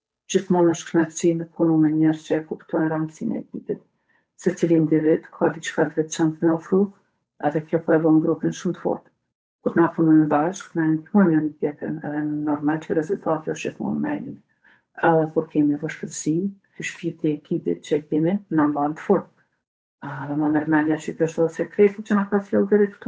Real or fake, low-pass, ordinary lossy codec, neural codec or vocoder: fake; none; none; codec, 16 kHz, 8 kbps, FunCodec, trained on Chinese and English, 25 frames a second